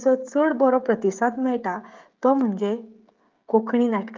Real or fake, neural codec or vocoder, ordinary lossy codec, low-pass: fake; codec, 16 kHz, 16 kbps, FreqCodec, smaller model; Opus, 24 kbps; 7.2 kHz